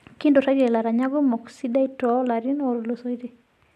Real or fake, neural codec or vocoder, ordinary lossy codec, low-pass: real; none; none; 14.4 kHz